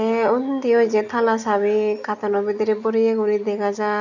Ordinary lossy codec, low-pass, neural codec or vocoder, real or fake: none; 7.2 kHz; none; real